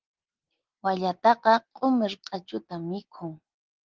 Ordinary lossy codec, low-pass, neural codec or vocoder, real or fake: Opus, 16 kbps; 7.2 kHz; none; real